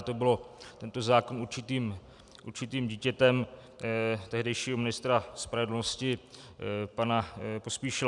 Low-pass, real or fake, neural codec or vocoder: 10.8 kHz; real; none